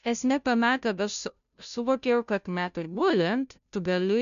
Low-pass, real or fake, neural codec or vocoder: 7.2 kHz; fake; codec, 16 kHz, 0.5 kbps, FunCodec, trained on Chinese and English, 25 frames a second